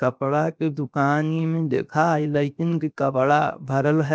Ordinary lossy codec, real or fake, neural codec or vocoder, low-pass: none; fake; codec, 16 kHz, 0.7 kbps, FocalCodec; none